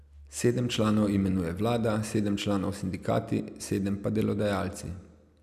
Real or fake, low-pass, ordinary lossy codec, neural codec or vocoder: real; 14.4 kHz; none; none